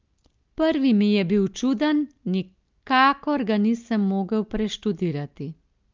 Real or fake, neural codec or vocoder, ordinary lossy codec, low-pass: real; none; Opus, 24 kbps; 7.2 kHz